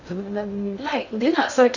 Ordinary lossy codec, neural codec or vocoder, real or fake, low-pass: none; codec, 16 kHz in and 24 kHz out, 0.6 kbps, FocalCodec, streaming, 2048 codes; fake; 7.2 kHz